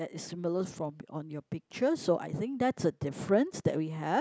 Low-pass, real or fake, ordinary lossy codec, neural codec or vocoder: none; real; none; none